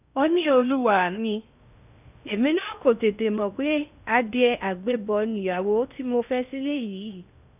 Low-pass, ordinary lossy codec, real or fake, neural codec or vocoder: 3.6 kHz; none; fake; codec, 16 kHz in and 24 kHz out, 0.6 kbps, FocalCodec, streaming, 4096 codes